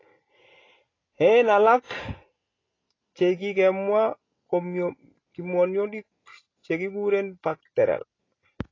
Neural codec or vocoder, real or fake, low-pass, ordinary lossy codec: none; real; 7.2 kHz; AAC, 32 kbps